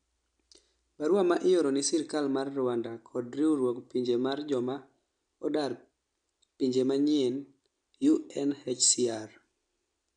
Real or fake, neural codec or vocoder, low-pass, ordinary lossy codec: real; none; 9.9 kHz; none